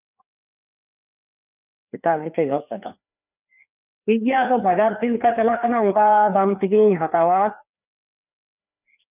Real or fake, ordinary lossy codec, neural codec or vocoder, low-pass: fake; none; codec, 16 kHz, 2 kbps, FreqCodec, larger model; 3.6 kHz